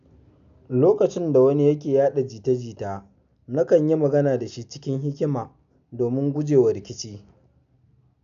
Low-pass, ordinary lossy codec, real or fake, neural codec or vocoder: 7.2 kHz; none; real; none